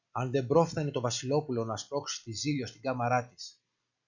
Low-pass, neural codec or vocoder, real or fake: 7.2 kHz; none; real